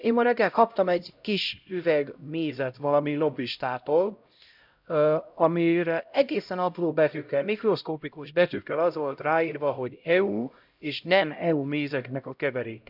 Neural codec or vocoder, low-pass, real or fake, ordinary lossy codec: codec, 16 kHz, 0.5 kbps, X-Codec, HuBERT features, trained on LibriSpeech; 5.4 kHz; fake; none